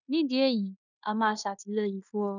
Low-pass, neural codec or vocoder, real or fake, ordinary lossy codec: 7.2 kHz; codec, 16 kHz in and 24 kHz out, 0.9 kbps, LongCat-Audio-Codec, fine tuned four codebook decoder; fake; none